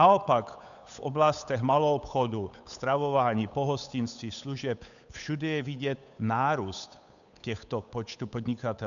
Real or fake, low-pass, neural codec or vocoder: fake; 7.2 kHz; codec, 16 kHz, 8 kbps, FunCodec, trained on Chinese and English, 25 frames a second